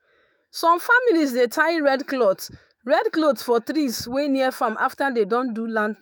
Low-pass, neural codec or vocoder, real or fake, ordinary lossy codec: none; autoencoder, 48 kHz, 128 numbers a frame, DAC-VAE, trained on Japanese speech; fake; none